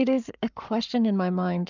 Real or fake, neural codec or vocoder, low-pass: fake; codec, 44.1 kHz, 7.8 kbps, Pupu-Codec; 7.2 kHz